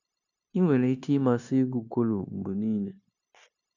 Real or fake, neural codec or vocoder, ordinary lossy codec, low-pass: fake; codec, 16 kHz, 0.9 kbps, LongCat-Audio-Codec; none; 7.2 kHz